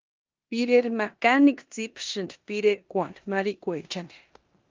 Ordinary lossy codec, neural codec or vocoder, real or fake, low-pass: Opus, 32 kbps; codec, 16 kHz in and 24 kHz out, 0.9 kbps, LongCat-Audio-Codec, four codebook decoder; fake; 7.2 kHz